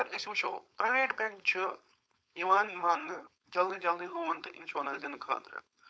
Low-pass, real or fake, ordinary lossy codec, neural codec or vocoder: none; fake; none; codec, 16 kHz, 4.8 kbps, FACodec